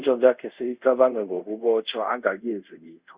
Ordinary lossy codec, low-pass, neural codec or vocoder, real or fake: Opus, 64 kbps; 3.6 kHz; codec, 24 kHz, 0.5 kbps, DualCodec; fake